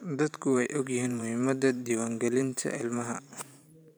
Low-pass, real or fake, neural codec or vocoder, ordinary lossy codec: none; fake; vocoder, 44.1 kHz, 128 mel bands every 512 samples, BigVGAN v2; none